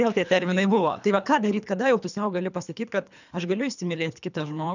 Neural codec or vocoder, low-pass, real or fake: codec, 24 kHz, 3 kbps, HILCodec; 7.2 kHz; fake